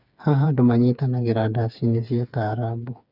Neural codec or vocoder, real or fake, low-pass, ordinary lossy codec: codec, 16 kHz, 4 kbps, FreqCodec, smaller model; fake; 5.4 kHz; none